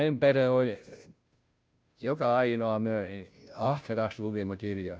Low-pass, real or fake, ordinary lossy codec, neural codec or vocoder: none; fake; none; codec, 16 kHz, 0.5 kbps, FunCodec, trained on Chinese and English, 25 frames a second